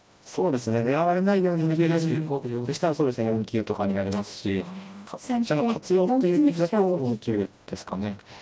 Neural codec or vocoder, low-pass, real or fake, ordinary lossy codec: codec, 16 kHz, 1 kbps, FreqCodec, smaller model; none; fake; none